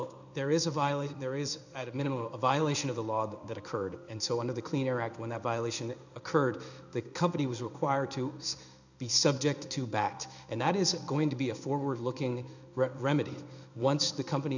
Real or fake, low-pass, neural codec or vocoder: fake; 7.2 kHz; codec, 16 kHz in and 24 kHz out, 1 kbps, XY-Tokenizer